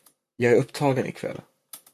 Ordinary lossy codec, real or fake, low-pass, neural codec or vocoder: MP3, 96 kbps; fake; 14.4 kHz; vocoder, 44.1 kHz, 128 mel bands, Pupu-Vocoder